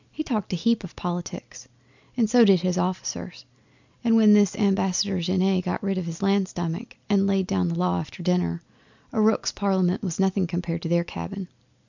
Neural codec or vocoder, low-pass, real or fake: none; 7.2 kHz; real